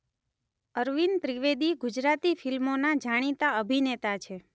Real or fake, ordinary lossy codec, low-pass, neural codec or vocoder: real; none; none; none